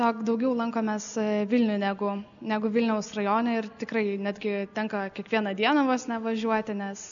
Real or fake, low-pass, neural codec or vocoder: real; 7.2 kHz; none